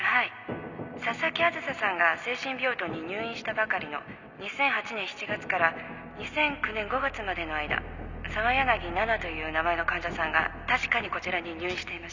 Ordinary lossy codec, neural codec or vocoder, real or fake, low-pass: none; none; real; 7.2 kHz